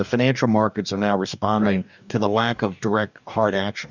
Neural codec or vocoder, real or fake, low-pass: codec, 44.1 kHz, 2.6 kbps, DAC; fake; 7.2 kHz